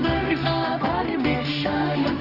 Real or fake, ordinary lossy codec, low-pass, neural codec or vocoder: fake; Opus, 24 kbps; 5.4 kHz; codec, 16 kHz, 1 kbps, X-Codec, HuBERT features, trained on general audio